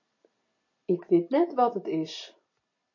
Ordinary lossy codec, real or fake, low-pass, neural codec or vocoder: MP3, 32 kbps; real; 7.2 kHz; none